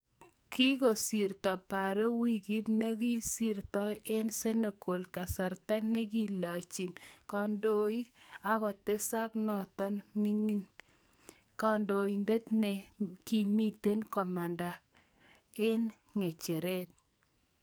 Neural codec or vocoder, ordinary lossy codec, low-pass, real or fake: codec, 44.1 kHz, 2.6 kbps, SNAC; none; none; fake